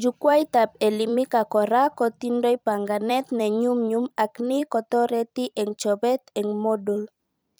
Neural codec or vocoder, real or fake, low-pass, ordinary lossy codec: vocoder, 44.1 kHz, 128 mel bands every 256 samples, BigVGAN v2; fake; none; none